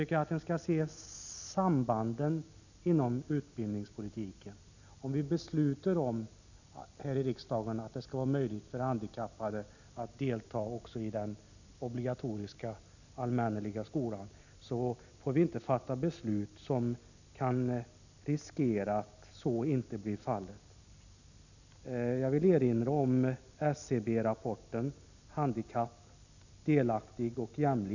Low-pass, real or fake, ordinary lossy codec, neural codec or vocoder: 7.2 kHz; real; none; none